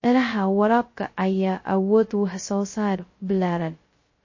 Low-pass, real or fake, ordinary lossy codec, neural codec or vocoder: 7.2 kHz; fake; MP3, 32 kbps; codec, 16 kHz, 0.2 kbps, FocalCodec